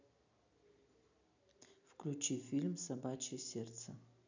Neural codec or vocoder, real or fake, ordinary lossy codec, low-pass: none; real; none; 7.2 kHz